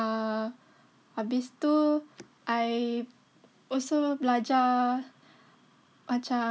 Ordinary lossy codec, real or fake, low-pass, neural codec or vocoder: none; real; none; none